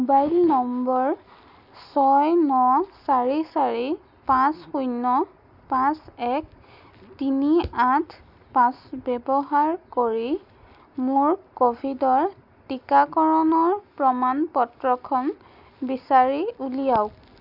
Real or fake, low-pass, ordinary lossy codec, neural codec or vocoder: real; 5.4 kHz; none; none